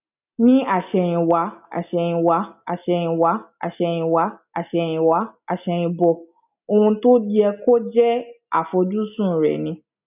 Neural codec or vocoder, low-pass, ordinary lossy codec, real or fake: none; 3.6 kHz; none; real